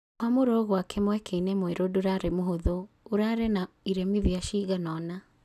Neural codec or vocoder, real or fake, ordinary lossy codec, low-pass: none; real; none; 14.4 kHz